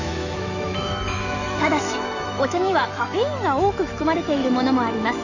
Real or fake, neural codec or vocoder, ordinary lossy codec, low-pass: fake; autoencoder, 48 kHz, 128 numbers a frame, DAC-VAE, trained on Japanese speech; none; 7.2 kHz